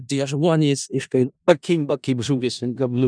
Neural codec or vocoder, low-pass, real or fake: codec, 16 kHz in and 24 kHz out, 0.4 kbps, LongCat-Audio-Codec, four codebook decoder; 9.9 kHz; fake